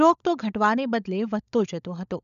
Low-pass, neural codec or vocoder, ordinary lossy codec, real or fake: 7.2 kHz; codec, 16 kHz, 16 kbps, FunCodec, trained on LibriTTS, 50 frames a second; none; fake